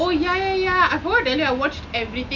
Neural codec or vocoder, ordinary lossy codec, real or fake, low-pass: none; none; real; 7.2 kHz